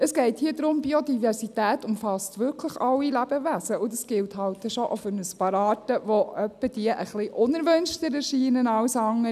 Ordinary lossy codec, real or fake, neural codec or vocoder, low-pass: none; real; none; 14.4 kHz